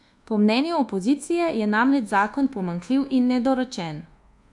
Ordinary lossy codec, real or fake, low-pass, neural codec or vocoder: AAC, 64 kbps; fake; 10.8 kHz; codec, 24 kHz, 1.2 kbps, DualCodec